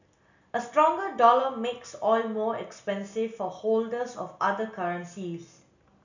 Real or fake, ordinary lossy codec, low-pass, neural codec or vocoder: real; none; 7.2 kHz; none